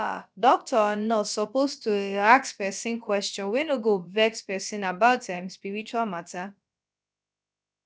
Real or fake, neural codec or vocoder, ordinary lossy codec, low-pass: fake; codec, 16 kHz, about 1 kbps, DyCAST, with the encoder's durations; none; none